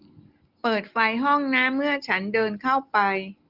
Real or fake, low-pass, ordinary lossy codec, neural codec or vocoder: real; 5.4 kHz; Opus, 24 kbps; none